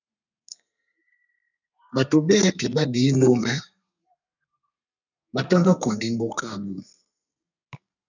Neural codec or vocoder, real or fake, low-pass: codec, 32 kHz, 1.9 kbps, SNAC; fake; 7.2 kHz